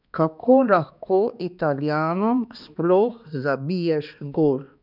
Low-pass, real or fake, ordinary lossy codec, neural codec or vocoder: 5.4 kHz; fake; none; codec, 16 kHz, 2 kbps, X-Codec, HuBERT features, trained on balanced general audio